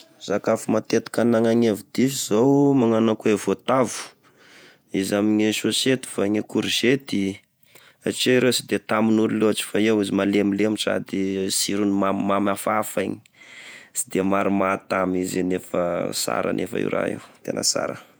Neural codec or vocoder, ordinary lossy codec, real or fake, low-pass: vocoder, 48 kHz, 128 mel bands, Vocos; none; fake; none